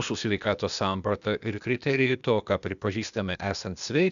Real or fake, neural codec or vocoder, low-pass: fake; codec, 16 kHz, 0.8 kbps, ZipCodec; 7.2 kHz